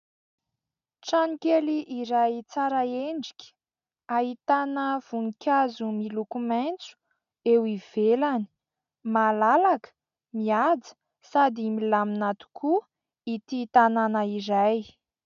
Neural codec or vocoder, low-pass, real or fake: none; 7.2 kHz; real